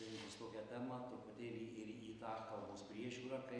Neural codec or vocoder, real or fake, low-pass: none; real; 9.9 kHz